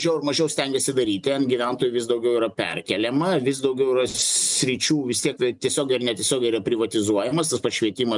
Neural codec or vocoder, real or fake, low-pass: none; real; 10.8 kHz